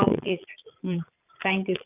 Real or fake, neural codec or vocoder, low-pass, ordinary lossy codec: fake; vocoder, 22.05 kHz, 80 mel bands, Vocos; 3.6 kHz; none